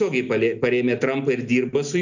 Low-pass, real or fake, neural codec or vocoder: 7.2 kHz; real; none